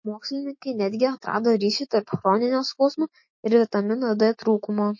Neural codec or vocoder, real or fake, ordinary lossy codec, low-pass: autoencoder, 48 kHz, 128 numbers a frame, DAC-VAE, trained on Japanese speech; fake; MP3, 32 kbps; 7.2 kHz